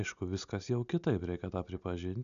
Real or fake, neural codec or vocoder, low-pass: real; none; 7.2 kHz